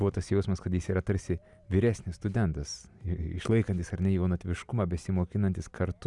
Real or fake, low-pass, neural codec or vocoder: real; 10.8 kHz; none